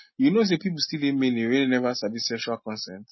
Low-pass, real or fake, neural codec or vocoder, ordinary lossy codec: 7.2 kHz; real; none; MP3, 24 kbps